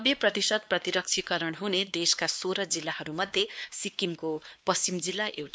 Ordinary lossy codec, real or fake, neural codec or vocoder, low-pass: none; fake; codec, 16 kHz, 2 kbps, X-Codec, WavLM features, trained on Multilingual LibriSpeech; none